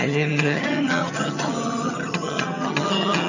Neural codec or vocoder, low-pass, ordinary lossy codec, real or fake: vocoder, 22.05 kHz, 80 mel bands, HiFi-GAN; 7.2 kHz; none; fake